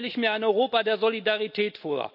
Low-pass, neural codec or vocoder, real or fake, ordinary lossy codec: 5.4 kHz; none; real; none